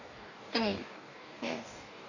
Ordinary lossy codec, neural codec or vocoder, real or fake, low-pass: none; codec, 44.1 kHz, 2.6 kbps, DAC; fake; 7.2 kHz